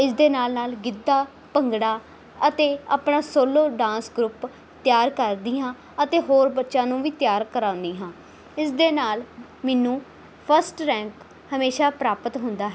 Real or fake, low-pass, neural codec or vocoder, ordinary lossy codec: real; none; none; none